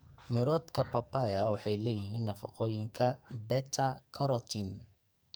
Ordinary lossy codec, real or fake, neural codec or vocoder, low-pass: none; fake; codec, 44.1 kHz, 2.6 kbps, SNAC; none